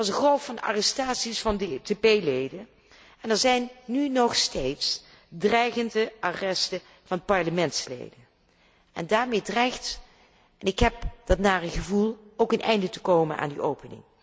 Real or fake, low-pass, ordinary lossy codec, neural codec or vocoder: real; none; none; none